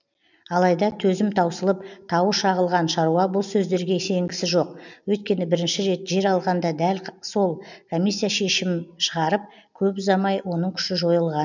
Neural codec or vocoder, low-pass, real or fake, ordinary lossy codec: none; 7.2 kHz; real; none